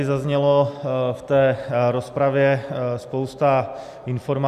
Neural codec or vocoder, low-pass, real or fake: none; 14.4 kHz; real